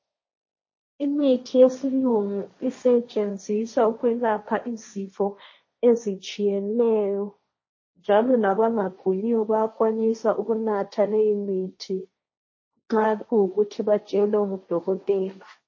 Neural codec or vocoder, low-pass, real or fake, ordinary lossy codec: codec, 16 kHz, 1.1 kbps, Voila-Tokenizer; 7.2 kHz; fake; MP3, 32 kbps